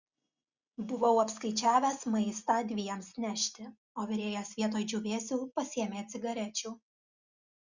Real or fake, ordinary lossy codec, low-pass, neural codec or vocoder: real; Opus, 64 kbps; 7.2 kHz; none